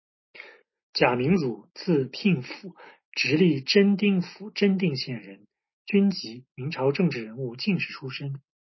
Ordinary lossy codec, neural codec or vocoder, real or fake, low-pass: MP3, 24 kbps; none; real; 7.2 kHz